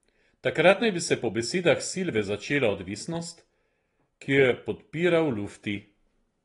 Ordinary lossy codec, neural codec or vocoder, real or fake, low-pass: AAC, 32 kbps; none; real; 10.8 kHz